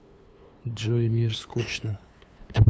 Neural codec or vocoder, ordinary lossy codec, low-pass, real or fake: codec, 16 kHz, 2 kbps, FunCodec, trained on LibriTTS, 25 frames a second; none; none; fake